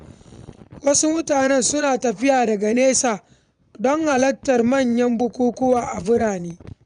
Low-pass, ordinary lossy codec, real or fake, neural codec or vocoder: 9.9 kHz; none; fake; vocoder, 22.05 kHz, 80 mel bands, Vocos